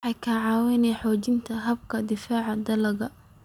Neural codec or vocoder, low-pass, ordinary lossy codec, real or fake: none; 19.8 kHz; none; real